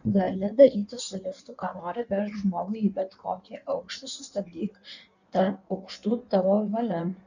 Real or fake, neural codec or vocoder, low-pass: fake; codec, 16 kHz in and 24 kHz out, 1.1 kbps, FireRedTTS-2 codec; 7.2 kHz